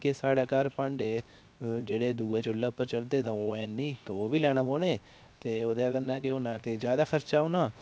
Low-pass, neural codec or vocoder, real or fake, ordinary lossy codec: none; codec, 16 kHz, 0.7 kbps, FocalCodec; fake; none